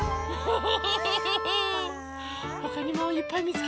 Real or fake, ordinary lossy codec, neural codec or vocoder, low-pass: real; none; none; none